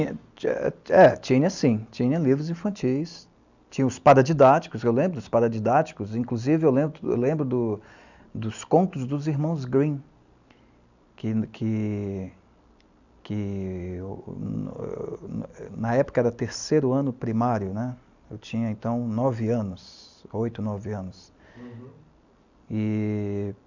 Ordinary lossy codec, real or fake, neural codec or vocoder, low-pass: none; real; none; 7.2 kHz